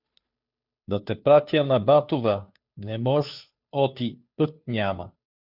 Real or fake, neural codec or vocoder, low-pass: fake; codec, 16 kHz, 2 kbps, FunCodec, trained on Chinese and English, 25 frames a second; 5.4 kHz